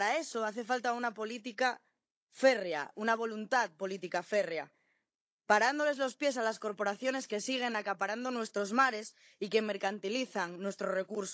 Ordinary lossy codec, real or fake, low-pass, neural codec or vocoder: none; fake; none; codec, 16 kHz, 16 kbps, FunCodec, trained on Chinese and English, 50 frames a second